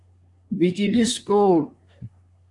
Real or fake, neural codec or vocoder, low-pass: fake; codec, 24 kHz, 1 kbps, SNAC; 10.8 kHz